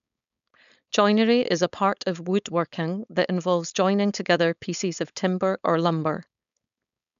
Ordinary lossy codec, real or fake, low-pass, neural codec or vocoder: none; fake; 7.2 kHz; codec, 16 kHz, 4.8 kbps, FACodec